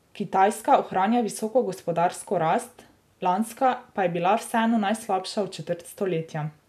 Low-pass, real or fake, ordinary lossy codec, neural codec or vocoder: 14.4 kHz; real; none; none